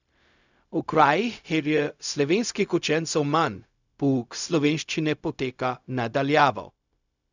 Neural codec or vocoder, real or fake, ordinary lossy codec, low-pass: codec, 16 kHz, 0.4 kbps, LongCat-Audio-Codec; fake; none; 7.2 kHz